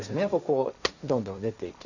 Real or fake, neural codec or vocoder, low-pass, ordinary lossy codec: fake; codec, 16 kHz, 1.1 kbps, Voila-Tokenizer; 7.2 kHz; none